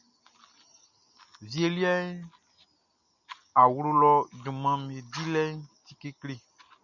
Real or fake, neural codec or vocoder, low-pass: real; none; 7.2 kHz